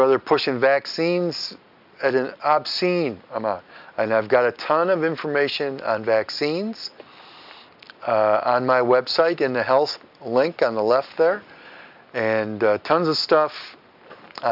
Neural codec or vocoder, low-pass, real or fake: none; 5.4 kHz; real